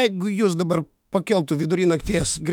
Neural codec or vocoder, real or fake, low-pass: autoencoder, 48 kHz, 32 numbers a frame, DAC-VAE, trained on Japanese speech; fake; 19.8 kHz